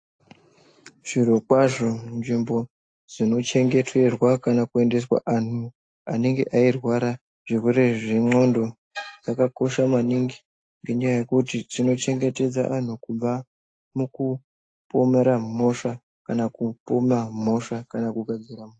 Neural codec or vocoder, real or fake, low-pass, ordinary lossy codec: none; real; 9.9 kHz; AAC, 48 kbps